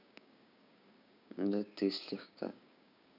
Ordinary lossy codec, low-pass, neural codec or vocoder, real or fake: none; 5.4 kHz; codec, 16 kHz, 6 kbps, DAC; fake